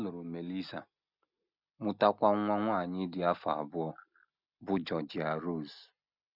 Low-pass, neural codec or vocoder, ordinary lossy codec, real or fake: 5.4 kHz; none; none; real